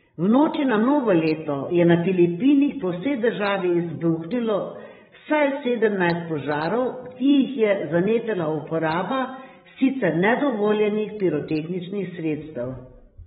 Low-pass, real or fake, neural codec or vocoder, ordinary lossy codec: 7.2 kHz; fake; codec, 16 kHz, 16 kbps, FreqCodec, larger model; AAC, 16 kbps